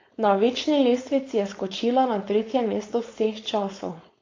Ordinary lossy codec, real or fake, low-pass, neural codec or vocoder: AAC, 32 kbps; fake; 7.2 kHz; codec, 16 kHz, 4.8 kbps, FACodec